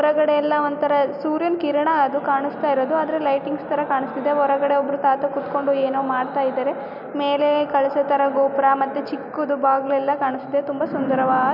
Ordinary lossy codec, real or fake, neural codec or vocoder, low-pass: none; real; none; 5.4 kHz